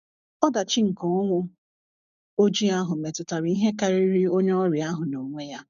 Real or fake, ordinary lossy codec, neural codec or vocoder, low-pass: fake; none; codec, 16 kHz, 6 kbps, DAC; 7.2 kHz